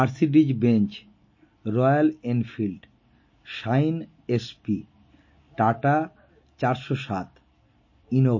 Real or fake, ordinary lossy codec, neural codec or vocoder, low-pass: real; MP3, 32 kbps; none; 7.2 kHz